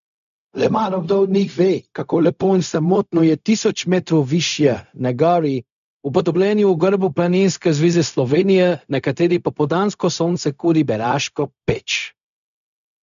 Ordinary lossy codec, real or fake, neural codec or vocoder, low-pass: none; fake; codec, 16 kHz, 0.4 kbps, LongCat-Audio-Codec; 7.2 kHz